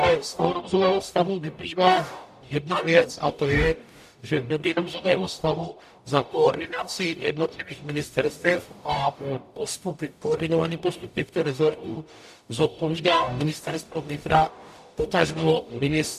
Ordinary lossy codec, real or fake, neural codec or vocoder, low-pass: AAC, 96 kbps; fake; codec, 44.1 kHz, 0.9 kbps, DAC; 14.4 kHz